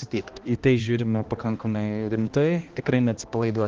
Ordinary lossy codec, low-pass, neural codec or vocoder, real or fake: Opus, 16 kbps; 7.2 kHz; codec, 16 kHz, 1 kbps, X-Codec, HuBERT features, trained on balanced general audio; fake